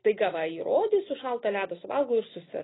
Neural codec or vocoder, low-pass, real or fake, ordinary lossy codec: none; 7.2 kHz; real; AAC, 16 kbps